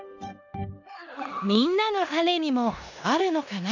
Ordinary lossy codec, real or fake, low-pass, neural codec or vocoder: none; fake; 7.2 kHz; codec, 16 kHz in and 24 kHz out, 0.9 kbps, LongCat-Audio-Codec, four codebook decoder